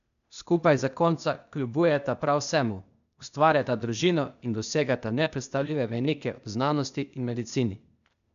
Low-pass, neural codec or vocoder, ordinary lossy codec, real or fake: 7.2 kHz; codec, 16 kHz, 0.8 kbps, ZipCodec; MP3, 96 kbps; fake